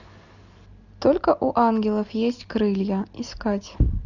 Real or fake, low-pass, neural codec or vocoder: real; 7.2 kHz; none